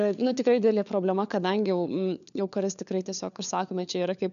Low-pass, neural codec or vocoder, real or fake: 7.2 kHz; codec, 16 kHz, 4 kbps, FunCodec, trained on LibriTTS, 50 frames a second; fake